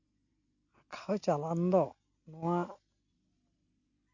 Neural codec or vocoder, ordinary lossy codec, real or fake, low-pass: none; none; real; 7.2 kHz